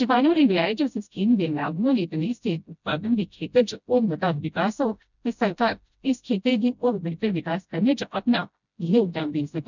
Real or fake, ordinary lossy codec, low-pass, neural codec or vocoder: fake; none; 7.2 kHz; codec, 16 kHz, 0.5 kbps, FreqCodec, smaller model